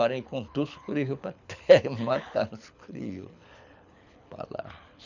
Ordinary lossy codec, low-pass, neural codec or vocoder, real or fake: none; 7.2 kHz; codec, 24 kHz, 6 kbps, HILCodec; fake